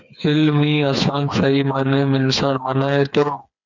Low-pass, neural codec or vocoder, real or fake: 7.2 kHz; codec, 16 kHz, 4 kbps, FreqCodec, smaller model; fake